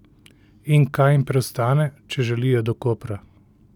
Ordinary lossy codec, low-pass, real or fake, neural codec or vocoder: none; 19.8 kHz; real; none